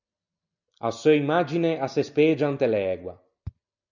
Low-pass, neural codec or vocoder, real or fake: 7.2 kHz; none; real